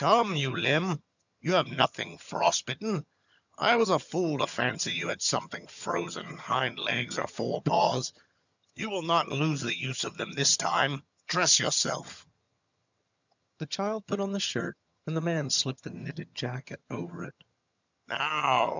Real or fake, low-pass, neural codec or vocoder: fake; 7.2 kHz; vocoder, 22.05 kHz, 80 mel bands, HiFi-GAN